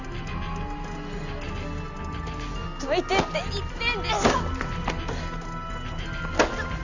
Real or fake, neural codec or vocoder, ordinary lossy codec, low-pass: real; none; none; 7.2 kHz